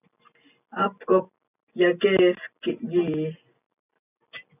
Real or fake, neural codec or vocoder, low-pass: real; none; 3.6 kHz